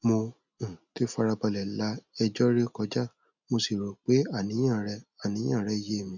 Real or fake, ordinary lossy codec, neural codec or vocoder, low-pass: real; none; none; 7.2 kHz